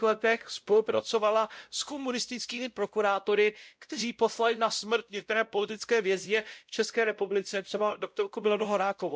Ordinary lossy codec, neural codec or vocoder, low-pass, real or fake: none; codec, 16 kHz, 0.5 kbps, X-Codec, WavLM features, trained on Multilingual LibriSpeech; none; fake